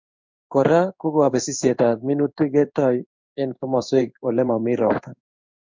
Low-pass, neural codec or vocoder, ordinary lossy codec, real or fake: 7.2 kHz; codec, 16 kHz in and 24 kHz out, 1 kbps, XY-Tokenizer; MP3, 64 kbps; fake